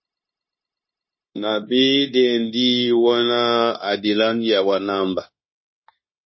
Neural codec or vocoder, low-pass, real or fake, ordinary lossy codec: codec, 16 kHz, 0.9 kbps, LongCat-Audio-Codec; 7.2 kHz; fake; MP3, 24 kbps